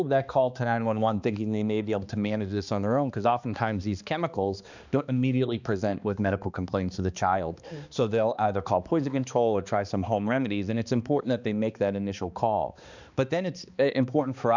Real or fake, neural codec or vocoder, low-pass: fake; codec, 16 kHz, 2 kbps, X-Codec, HuBERT features, trained on balanced general audio; 7.2 kHz